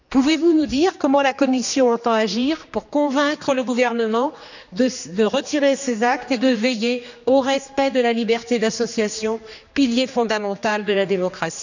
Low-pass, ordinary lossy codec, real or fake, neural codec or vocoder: 7.2 kHz; none; fake; codec, 16 kHz, 2 kbps, X-Codec, HuBERT features, trained on general audio